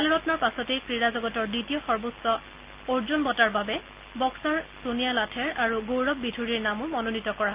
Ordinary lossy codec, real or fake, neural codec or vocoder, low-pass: Opus, 24 kbps; real; none; 3.6 kHz